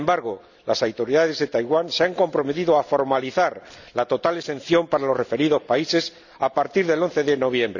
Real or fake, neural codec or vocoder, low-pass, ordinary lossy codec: real; none; 7.2 kHz; none